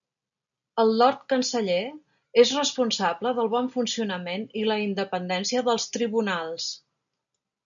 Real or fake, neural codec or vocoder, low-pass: real; none; 7.2 kHz